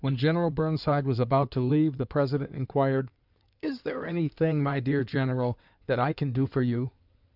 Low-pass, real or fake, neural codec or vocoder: 5.4 kHz; fake; codec, 16 kHz in and 24 kHz out, 2.2 kbps, FireRedTTS-2 codec